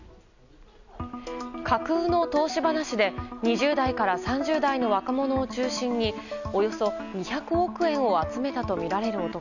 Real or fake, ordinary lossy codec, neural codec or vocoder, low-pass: real; none; none; 7.2 kHz